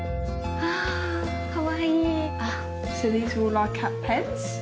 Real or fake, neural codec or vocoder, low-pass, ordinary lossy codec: real; none; none; none